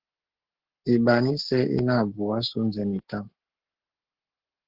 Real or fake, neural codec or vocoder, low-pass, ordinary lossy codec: fake; codec, 44.1 kHz, 7.8 kbps, Pupu-Codec; 5.4 kHz; Opus, 16 kbps